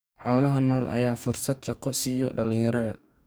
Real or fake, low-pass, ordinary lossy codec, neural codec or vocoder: fake; none; none; codec, 44.1 kHz, 2.6 kbps, DAC